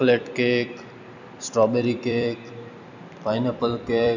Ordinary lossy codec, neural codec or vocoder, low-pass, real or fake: none; vocoder, 44.1 kHz, 128 mel bands every 256 samples, BigVGAN v2; 7.2 kHz; fake